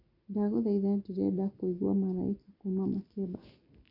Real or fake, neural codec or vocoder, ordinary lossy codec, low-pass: real; none; none; 5.4 kHz